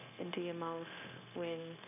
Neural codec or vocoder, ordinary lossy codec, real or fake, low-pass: codec, 16 kHz, 0.9 kbps, LongCat-Audio-Codec; none; fake; 3.6 kHz